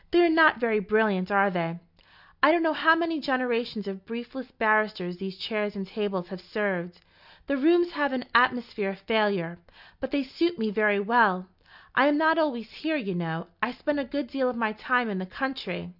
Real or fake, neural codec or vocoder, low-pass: real; none; 5.4 kHz